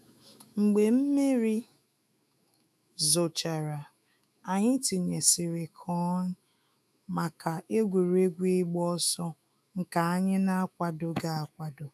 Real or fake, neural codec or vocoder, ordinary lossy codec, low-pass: fake; autoencoder, 48 kHz, 128 numbers a frame, DAC-VAE, trained on Japanese speech; none; 14.4 kHz